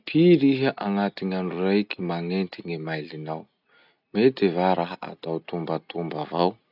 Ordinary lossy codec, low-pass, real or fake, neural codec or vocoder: none; 5.4 kHz; real; none